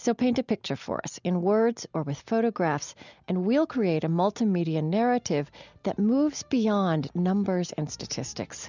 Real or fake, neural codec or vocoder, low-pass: real; none; 7.2 kHz